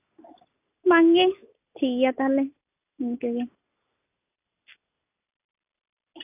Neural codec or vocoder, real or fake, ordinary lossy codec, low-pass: none; real; AAC, 32 kbps; 3.6 kHz